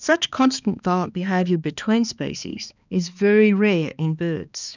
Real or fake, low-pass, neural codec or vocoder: fake; 7.2 kHz; codec, 16 kHz, 2 kbps, X-Codec, HuBERT features, trained on balanced general audio